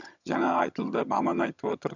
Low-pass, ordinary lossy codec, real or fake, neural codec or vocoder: 7.2 kHz; none; fake; vocoder, 22.05 kHz, 80 mel bands, HiFi-GAN